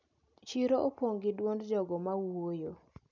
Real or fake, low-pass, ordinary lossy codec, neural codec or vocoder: real; 7.2 kHz; none; none